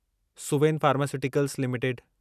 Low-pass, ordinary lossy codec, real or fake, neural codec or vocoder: 14.4 kHz; none; real; none